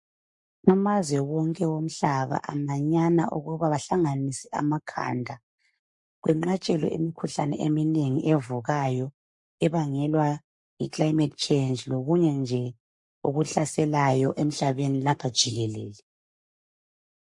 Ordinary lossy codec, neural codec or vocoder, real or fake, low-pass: MP3, 48 kbps; codec, 44.1 kHz, 7.8 kbps, Pupu-Codec; fake; 10.8 kHz